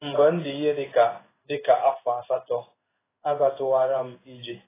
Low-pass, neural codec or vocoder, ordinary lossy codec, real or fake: 3.6 kHz; codec, 16 kHz in and 24 kHz out, 1 kbps, XY-Tokenizer; AAC, 16 kbps; fake